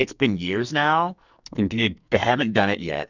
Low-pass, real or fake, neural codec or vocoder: 7.2 kHz; fake; codec, 44.1 kHz, 2.6 kbps, SNAC